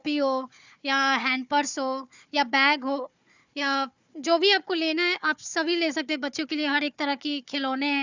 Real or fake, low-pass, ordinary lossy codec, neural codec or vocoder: fake; 7.2 kHz; Opus, 64 kbps; codec, 16 kHz, 4 kbps, FunCodec, trained on Chinese and English, 50 frames a second